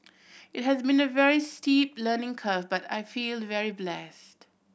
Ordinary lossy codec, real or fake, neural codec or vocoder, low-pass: none; real; none; none